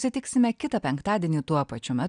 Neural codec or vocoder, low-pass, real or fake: none; 9.9 kHz; real